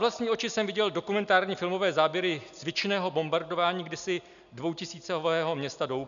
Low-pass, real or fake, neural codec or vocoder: 7.2 kHz; real; none